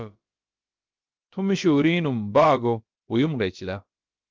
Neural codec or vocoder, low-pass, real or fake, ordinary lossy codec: codec, 16 kHz, about 1 kbps, DyCAST, with the encoder's durations; 7.2 kHz; fake; Opus, 24 kbps